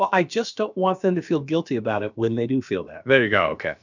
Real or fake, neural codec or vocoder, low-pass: fake; codec, 16 kHz, about 1 kbps, DyCAST, with the encoder's durations; 7.2 kHz